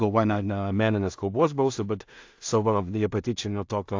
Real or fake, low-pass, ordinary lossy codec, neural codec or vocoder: fake; 7.2 kHz; AAC, 48 kbps; codec, 16 kHz in and 24 kHz out, 0.4 kbps, LongCat-Audio-Codec, two codebook decoder